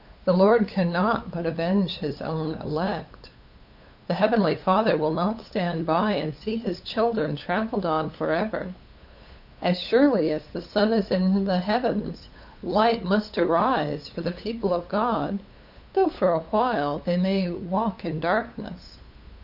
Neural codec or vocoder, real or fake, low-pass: codec, 16 kHz, 8 kbps, FunCodec, trained on LibriTTS, 25 frames a second; fake; 5.4 kHz